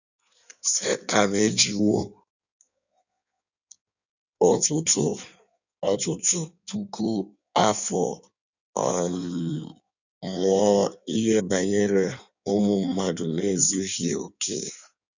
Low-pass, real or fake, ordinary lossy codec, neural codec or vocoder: 7.2 kHz; fake; none; codec, 16 kHz in and 24 kHz out, 1.1 kbps, FireRedTTS-2 codec